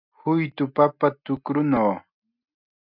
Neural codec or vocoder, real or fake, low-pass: none; real; 5.4 kHz